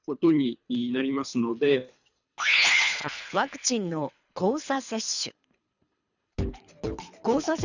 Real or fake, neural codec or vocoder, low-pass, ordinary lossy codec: fake; codec, 24 kHz, 3 kbps, HILCodec; 7.2 kHz; none